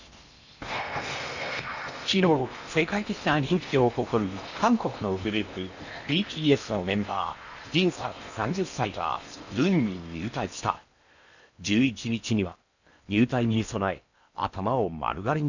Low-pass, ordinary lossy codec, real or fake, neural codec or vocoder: 7.2 kHz; none; fake; codec, 16 kHz in and 24 kHz out, 0.8 kbps, FocalCodec, streaming, 65536 codes